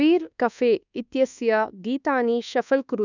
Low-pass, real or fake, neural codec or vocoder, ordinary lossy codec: 7.2 kHz; fake; codec, 24 kHz, 1.2 kbps, DualCodec; none